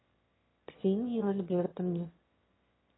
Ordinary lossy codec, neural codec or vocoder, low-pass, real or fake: AAC, 16 kbps; autoencoder, 22.05 kHz, a latent of 192 numbers a frame, VITS, trained on one speaker; 7.2 kHz; fake